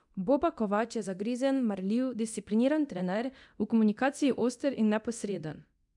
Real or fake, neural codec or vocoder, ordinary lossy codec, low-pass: fake; codec, 24 kHz, 0.9 kbps, DualCodec; none; 10.8 kHz